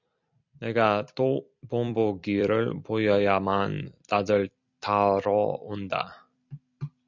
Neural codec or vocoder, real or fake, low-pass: vocoder, 44.1 kHz, 128 mel bands every 256 samples, BigVGAN v2; fake; 7.2 kHz